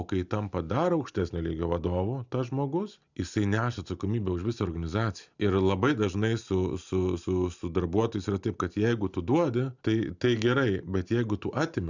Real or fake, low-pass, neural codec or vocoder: real; 7.2 kHz; none